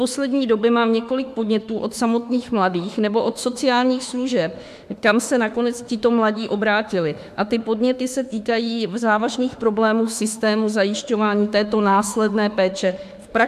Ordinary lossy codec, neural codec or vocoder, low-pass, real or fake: AAC, 96 kbps; autoencoder, 48 kHz, 32 numbers a frame, DAC-VAE, trained on Japanese speech; 14.4 kHz; fake